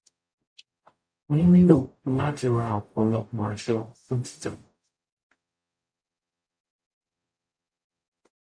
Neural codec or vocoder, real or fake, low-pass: codec, 44.1 kHz, 0.9 kbps, DAC; fake; 9.9 kHz